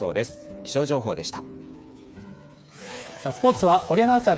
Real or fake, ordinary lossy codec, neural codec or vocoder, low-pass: fake; none; codec, 16 kHz, 4 kbps, FreqCodec, smaller model; none